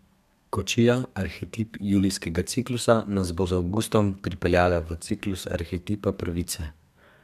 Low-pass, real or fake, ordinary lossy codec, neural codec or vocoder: 14.4 kHz; fake; MP3, 96 kbps; codec, 32 kHz, 1.9 kbps, SNAC